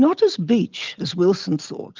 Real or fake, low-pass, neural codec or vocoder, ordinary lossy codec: real; 7.2 kHz; none; Opus, 32 kbps